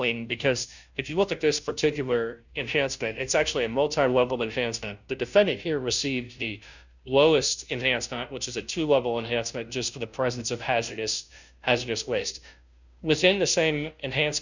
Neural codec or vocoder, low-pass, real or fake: codec, 16 kHz, 0.5 kbps, FunCodec, trained on Chinese and English, 25 frames a second; 7.2 kHz; fake